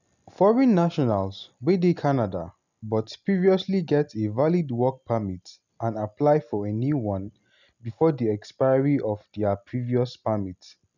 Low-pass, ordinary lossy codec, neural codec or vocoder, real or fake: 7.2 kHz; none; none; real